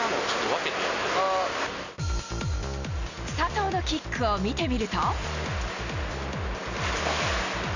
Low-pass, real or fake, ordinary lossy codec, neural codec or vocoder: 7.2 kHz; real; none; none